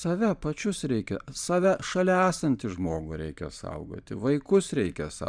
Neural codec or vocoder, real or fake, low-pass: vocoder, 44.1 kHz, 128 mel bands every 512 samples, BigVGAN v2; fake; 9.9 kHz